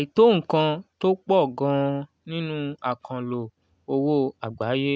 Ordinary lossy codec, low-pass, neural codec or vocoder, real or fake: none; none; none; real